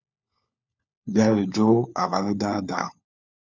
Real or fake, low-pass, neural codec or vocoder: fake; 7.2 kHz; codec, 16 kHz, 4 kbps, FunCodec, trained on LibriTTS, 50 frames a second